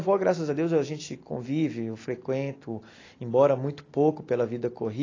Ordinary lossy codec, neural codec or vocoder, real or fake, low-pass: AAC, 32 kbps; none; real; 7.2 kHz